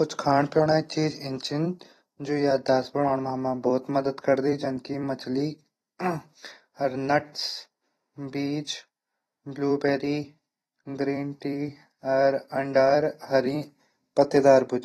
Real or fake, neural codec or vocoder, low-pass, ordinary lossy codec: fake; vocoder, 44.1 kHz, 128 mel bands every 256 samples, BigVGAN v2; 19.8 kHz; AAC, 32 kbps